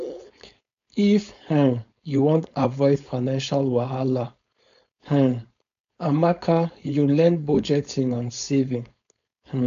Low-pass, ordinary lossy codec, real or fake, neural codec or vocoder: 7.2 kHz; AAC, 64 kbps; fake; codec, 16 kHz, 4.8 kbps, FACodec